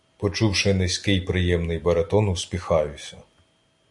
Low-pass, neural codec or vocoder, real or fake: 10.8 kHz; none; real